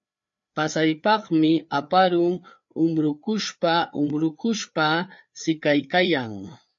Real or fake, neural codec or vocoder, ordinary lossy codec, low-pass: fake; codec, 16 kHz, 4 kbps, FreqCodec, larger model; MP3, 48 kbps; 7.2 kHz